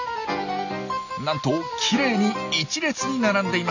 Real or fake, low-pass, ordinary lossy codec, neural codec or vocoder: real; 7.2 kHz; none; none